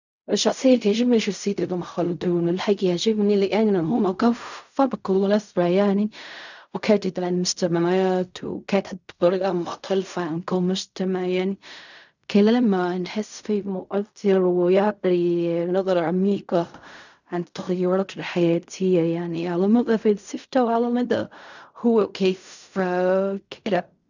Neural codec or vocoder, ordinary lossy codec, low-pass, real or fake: codec, 16 kHz in and 24 kHz out, 0.4 kbps, LongCat-Audio-Codec, fine tuned four codebook decoder; none; 7.2 kHz; fake